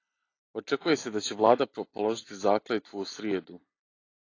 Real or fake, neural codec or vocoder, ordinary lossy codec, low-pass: real; none; AAC, 32 kbps; 7.2 kHz